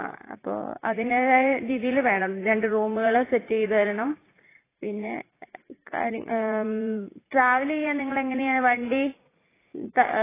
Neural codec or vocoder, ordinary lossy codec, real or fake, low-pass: vocoder, 44.1 kHz, 80 mel bands, Vocos; AAC, 16 kbps; fake; 3.6 kHz